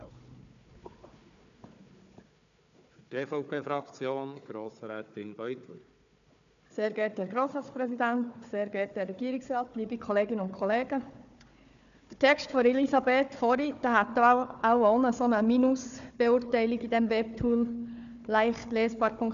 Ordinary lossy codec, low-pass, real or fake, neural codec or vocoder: none; 7.2 kHz; fake; codec, 16 kHz, 4 kbps, FunCodec, trained on Chinese and English, 50 frames a second